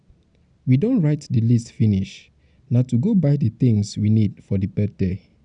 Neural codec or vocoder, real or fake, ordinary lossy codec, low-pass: none; real; none; 9.9 kHz